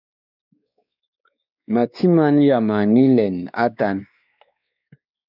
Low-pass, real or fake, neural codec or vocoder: 5.4 kHz; fake; codec, 16 kHz, 2 kbps, X-Codec, WavLM features, trained on Multilingual LibriSpeech